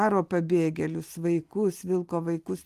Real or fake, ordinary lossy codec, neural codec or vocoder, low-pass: real; Opus, 24 kbps; none; 14.4 kHz